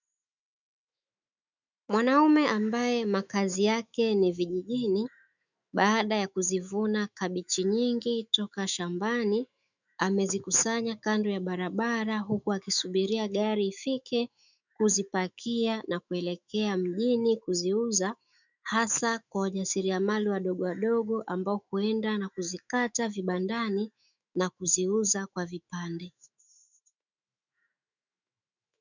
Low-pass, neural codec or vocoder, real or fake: 7.2 kHz; autoencoder, 48 kHz, 128 numbers a frame, DAC-VAE, trained on Japanese speech; fake